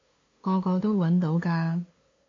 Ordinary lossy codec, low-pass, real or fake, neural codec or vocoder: AAC, 32 kbps; 7.2 kHz; fake; codec, 16 kHz, 2 kbps, FunCodec, trained on LibriTTS, 25 frames a second